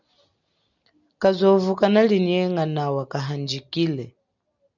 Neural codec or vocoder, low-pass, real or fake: none; 7.2 kHz; real